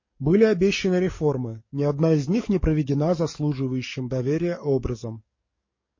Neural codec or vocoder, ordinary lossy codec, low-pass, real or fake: codec, 44.1 kHz, 7.8 kbps, DAC; MP3, 32 kbps; 7.2 kHz; fake